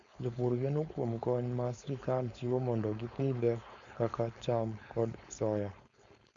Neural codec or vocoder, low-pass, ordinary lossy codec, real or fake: codec, 16 kHz, 4.8 kbps, FACodec; 7.2 kHz; Opus, 64 kbps; fake